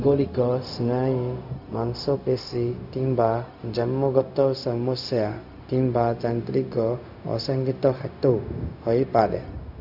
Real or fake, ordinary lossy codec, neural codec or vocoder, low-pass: fake; AAC, 48 kbps; codec, 16 kHz, 0.4 kbps, LongCat-Audio-Codec; 5.4 kHz